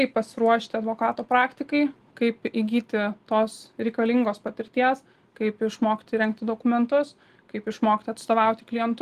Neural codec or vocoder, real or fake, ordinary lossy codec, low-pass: none; real; Opus, 24 kbps; 14.4 kHz